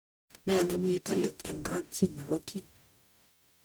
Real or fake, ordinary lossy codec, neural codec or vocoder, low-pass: fake; none; codec, 44.1 kHz, 0.9 kbps, DAC; none